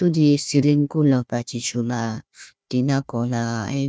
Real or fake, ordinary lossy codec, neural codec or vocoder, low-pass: fake; none; codec, 16 kHz, 1 kbps, FunCodec, trained on Chinese and English, 50 frames a second; none